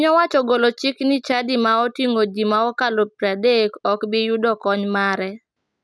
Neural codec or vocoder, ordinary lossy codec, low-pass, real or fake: none; none; none; real